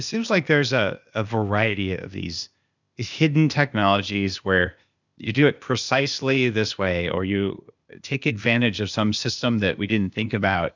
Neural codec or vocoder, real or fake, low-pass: codec, 16 kHz, 0.8 kbps, ZipCodec; fake; 7.2 kHz